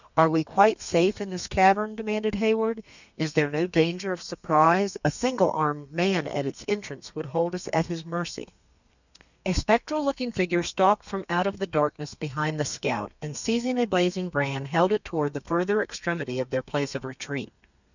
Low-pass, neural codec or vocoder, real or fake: 7.2 kHz; codec, 32 kHz, 1.9 kbps, SNAC; fake